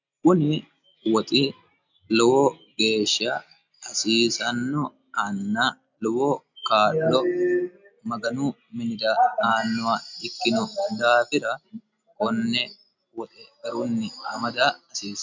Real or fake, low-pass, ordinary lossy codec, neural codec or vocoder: real; 7.2 kHz; MP3, 64 kbps; none